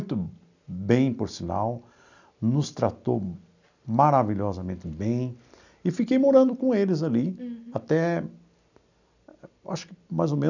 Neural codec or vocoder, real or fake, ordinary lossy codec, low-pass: none; real; none; 7.2 kHz